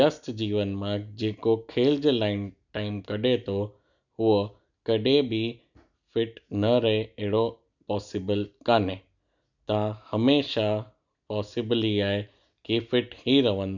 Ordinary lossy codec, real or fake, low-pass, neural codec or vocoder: none; real; 7.2 kHz; none